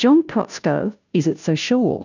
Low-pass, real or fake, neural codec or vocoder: 7.2 kHz; fake; codec, 16 kHz, 0.5 kbps, FunCodec, trained on Chinese and English, 25 frames a second